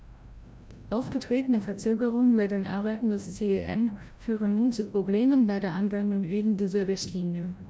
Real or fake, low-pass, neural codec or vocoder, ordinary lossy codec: fake; none; codec, 16 kHz, 0.5 kbps, FreqCodec, larger model; none